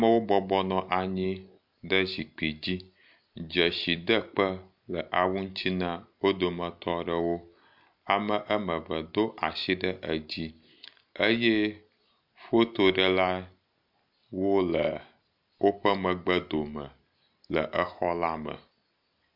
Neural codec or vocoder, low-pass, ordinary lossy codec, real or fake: none; 5.4 kHz; MP3, 48 kbps; real